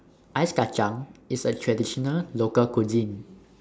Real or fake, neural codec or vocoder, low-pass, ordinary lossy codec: real; none; none; none